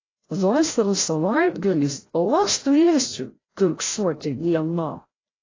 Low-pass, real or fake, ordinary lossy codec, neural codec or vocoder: 7.2 kHz; fake; AAC, 32 kbps; codec, 16 kHz, 0.5 kbps, FreqCodec, larger model